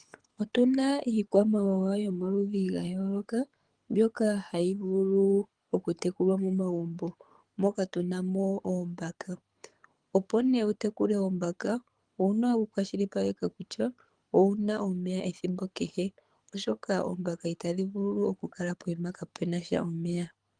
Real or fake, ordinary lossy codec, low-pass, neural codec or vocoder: fake; Opus, 24 kbps; 9.9 kHz; codec, 24 kHz, 6 kbps, HILCodec